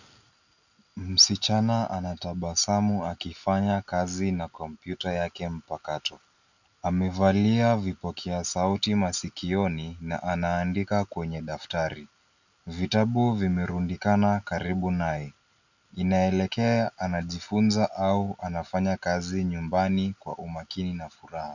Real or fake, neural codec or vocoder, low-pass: real; none; 7.2 kHz